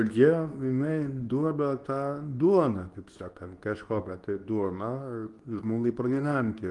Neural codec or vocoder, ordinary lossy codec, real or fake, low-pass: codec, 24 kHz, 0.9 kbps, WavTokenizer, medium speech release version 2; Opus, 24 kbps; fake; 10.8 kHz